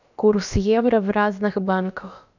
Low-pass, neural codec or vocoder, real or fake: 7.2 kHz; codec, 16 kHz, about 1 kbps, DyCAST, with the encoder's durations; fake